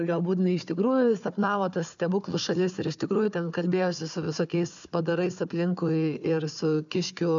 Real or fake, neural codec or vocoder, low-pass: fake; codec, 16 kHz, 4 kbps, FunCodec, trained on Chinese and English, 50 frames a second; 7.2 kHz